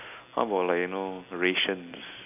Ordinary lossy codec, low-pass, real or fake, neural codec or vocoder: none; 3.6 kHz; real; none